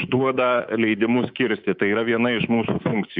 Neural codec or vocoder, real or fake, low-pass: codec, 24 kHz, 3.1 kbps, DualCodec; fake; 5.4 kHz